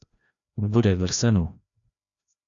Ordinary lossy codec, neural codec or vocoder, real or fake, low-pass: Opus, 64 kbps; codec, 16 kHz, 1 kbps, FreqCodec, larger model; fake; 7.2 kHz